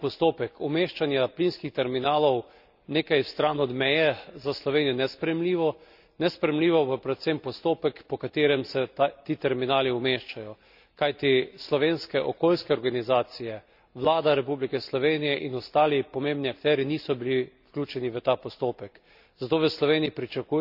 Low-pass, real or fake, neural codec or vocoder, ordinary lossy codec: 5.4 kHz; real; none; none